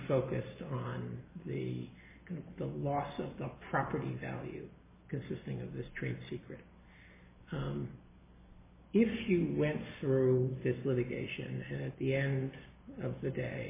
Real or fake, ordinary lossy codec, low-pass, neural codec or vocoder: real; MP3, 16 kbps; 3.6 kHz; none